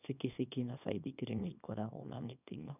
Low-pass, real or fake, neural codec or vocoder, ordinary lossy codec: 3.6 kHz; fake; codec, 24 kHz, 0.9 kbps, WavTokenizer, small release; none